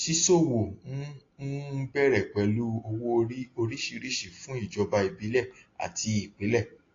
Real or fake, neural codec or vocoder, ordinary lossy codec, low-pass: real; none; AAC, 32 kbps; 7.2 kHz